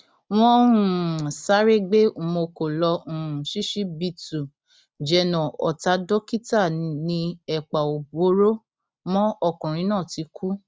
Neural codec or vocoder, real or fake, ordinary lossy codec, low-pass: none; real; none; none